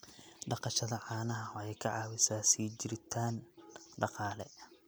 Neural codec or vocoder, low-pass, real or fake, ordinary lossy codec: none; none; real; none